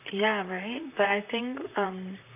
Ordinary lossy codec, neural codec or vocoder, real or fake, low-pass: none; vocoder, 44.1 kHz, 128 mel bands, Pupu-Vocoder; fake; 3.6 kHz